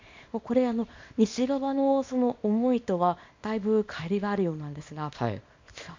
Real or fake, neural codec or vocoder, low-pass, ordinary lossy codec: fake; codec, 24 kHz, 0.9 kbps, WavTokenizer, small release; 7.2 kHz; MP3, 48 kbps